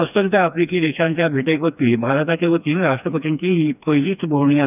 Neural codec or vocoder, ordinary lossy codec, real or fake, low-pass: codec, 16 kHz, 2 kbps, FreqCodec, smaller model; none; fake; 3.6 kHz